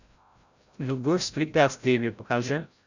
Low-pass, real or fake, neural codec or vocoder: 7.2 kHz; fake; codec, 16 kHz, 0.5 kbps, FreqCodec, larger model